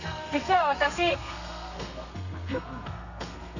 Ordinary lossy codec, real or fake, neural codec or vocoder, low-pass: none; fake; codec, 32 kHz, 1.9 kbps, SNAC; 7.2 kHz